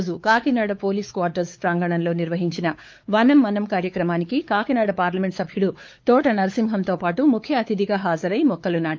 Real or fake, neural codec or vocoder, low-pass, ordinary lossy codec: fake; codec, 16 kHz, 4 kbps, X-Codec, WavLM features, trained on Multilingual LibriSpeech; 7.2 kHz; Opus, 24 kbps